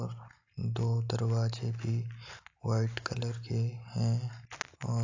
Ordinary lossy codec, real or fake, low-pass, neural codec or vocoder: AAC, 48 kbps; real; 7.2 kHz; none